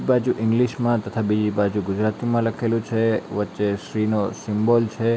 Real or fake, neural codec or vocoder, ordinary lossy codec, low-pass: real; none; none; none